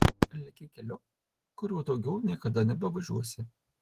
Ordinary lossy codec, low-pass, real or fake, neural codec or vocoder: Opus, 16 kbps; 14.4 kHz; fake; autoencoder, 48 kHz, 128 numbers a frame, DAC-VAE, trained on Japanese speech